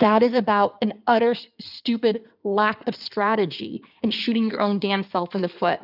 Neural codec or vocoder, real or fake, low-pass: codec, 16 kHz in and 24 kHz out, 2.2 kbps, FireRedTTS-2 codec; fake; 5.4 kHz